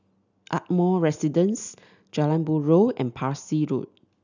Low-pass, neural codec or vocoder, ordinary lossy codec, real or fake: 7.2 kHz; none; none; real